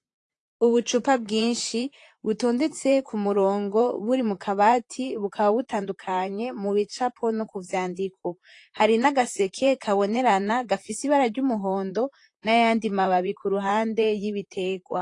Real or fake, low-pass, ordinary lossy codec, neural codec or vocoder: fake; 10.8 kHz; AAC, 48 kbps; vocoder, 44.1 kHz, 128 mel bands, Pupu-Vocoder